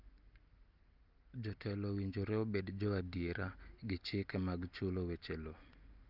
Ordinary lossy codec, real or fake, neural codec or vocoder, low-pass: Opus, 24 kbps; real; none; 5.4 kHz